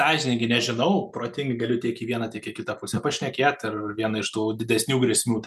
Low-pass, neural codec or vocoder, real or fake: 14.4 kHz; none; real